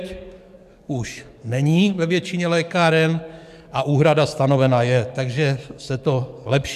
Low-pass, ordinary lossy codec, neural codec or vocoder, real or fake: 14.4 kHz; MP3, 96 kbps; codec, 44.1 kHz, 7.8 kbps, DAC; fake